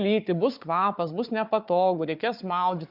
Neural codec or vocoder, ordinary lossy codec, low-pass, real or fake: codec, 16 kHz, 4 kbps, FunCodec, trained on Chinese and English, 50 frames a second; Opus, 64 kbps; 5.4 kHz; fake